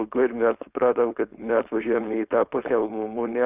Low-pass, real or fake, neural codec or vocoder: 3.6 kHz; fake; codec, 16 kHz, 4.8 kbps, FACodec